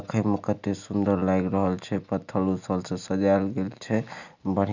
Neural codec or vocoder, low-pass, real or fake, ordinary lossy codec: none; 7.2 kHz; real; none